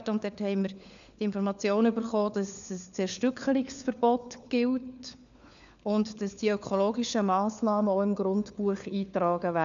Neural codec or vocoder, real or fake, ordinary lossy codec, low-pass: codec, 16 kHz, 4 kbps, FunCodec, trained on LibriTTS, 50 frames a second; fake; none; 7.2 kHz